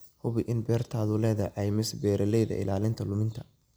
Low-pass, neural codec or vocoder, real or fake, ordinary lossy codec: none; none; real; none